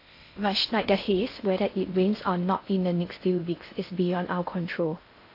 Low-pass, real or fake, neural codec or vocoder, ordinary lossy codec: 5.4 kHz; fake; codec, 16 kHz in and 24 kHz out, 0.6 kbps, FocalCodec, streaming, 4096 codes; AAC, 32 kbps